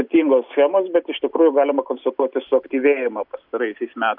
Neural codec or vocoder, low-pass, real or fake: none; 5.4 kHz; real